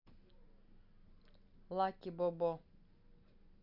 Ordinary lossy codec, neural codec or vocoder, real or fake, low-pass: none; none; real; 5.4 kHz